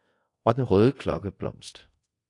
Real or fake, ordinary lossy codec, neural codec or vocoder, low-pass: fake; AAC, 48 kbps; codec, 24 kHz, 0.5 kbps, DualCodec; 10.8 kHz